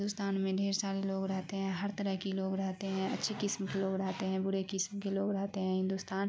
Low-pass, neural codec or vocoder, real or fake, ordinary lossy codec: none; none; real; none